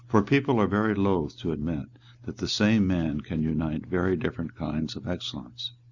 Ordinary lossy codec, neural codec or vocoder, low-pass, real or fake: Opus, 64 kbps; none; 7.2 kHz; real